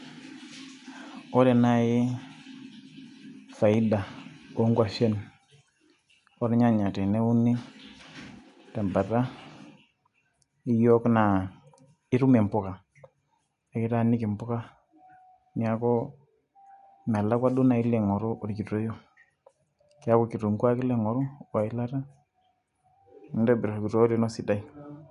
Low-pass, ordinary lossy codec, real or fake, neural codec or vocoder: 10.8 kHz; none; real; none